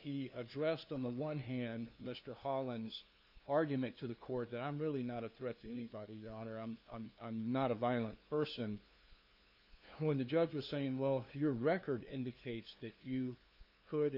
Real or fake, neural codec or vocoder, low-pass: fake; codec, 16 kHz, 2 kbps, FunCodec, trained on LibriTTS, 25 frames a second; 5.4 kHz